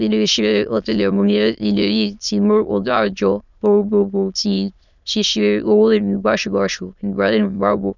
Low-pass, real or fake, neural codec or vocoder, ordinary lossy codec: 7.2 kHz; fake; autoencoder, 22.05 kHz, a latent of 192 numbers a frame, VITS, trained on many speakers; none